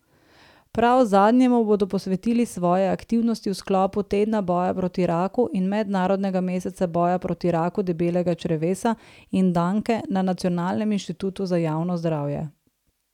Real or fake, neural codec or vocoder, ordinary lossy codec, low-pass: real; none; none; 19.8 kHz